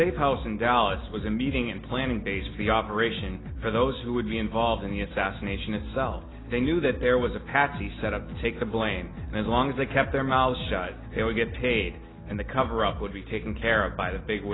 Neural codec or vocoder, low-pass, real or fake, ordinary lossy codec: none; 7.2 kHz; real; AAC, 16 kbps